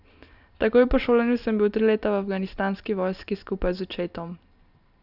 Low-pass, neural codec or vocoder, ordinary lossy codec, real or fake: 5.4 kHz; none; none; real